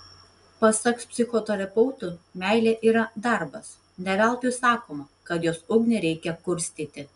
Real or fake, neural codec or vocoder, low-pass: real; none; 10.8 kHz